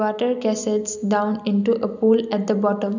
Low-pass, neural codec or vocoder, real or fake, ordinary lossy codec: 7.2 kHz; none; real; none